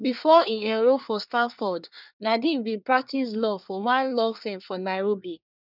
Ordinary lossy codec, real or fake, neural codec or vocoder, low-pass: none; fake; codec, 24 kHz, 1 kbps, SNAC; 5.4 kHz